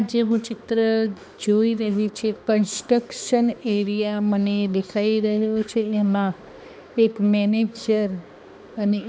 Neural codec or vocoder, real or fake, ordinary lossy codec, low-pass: codec, 16 kHz, 2 kbps, X-Codec, HuBERT features, trained on balanced general audio; fake; none; none